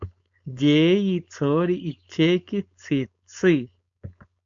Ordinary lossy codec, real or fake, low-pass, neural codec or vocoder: MP3, 64 kbps; fake; 7.2 kHz; codec, 16 kHz, 4.8 kbps, FACodec